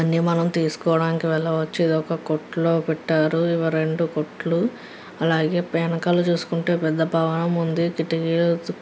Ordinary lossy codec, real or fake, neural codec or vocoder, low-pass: none; real; none; none